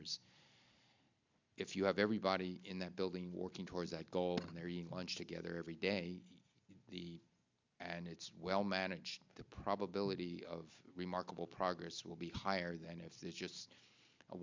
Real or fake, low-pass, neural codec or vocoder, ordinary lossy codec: real; 7.2 kHz; none; MP3, 64 kbps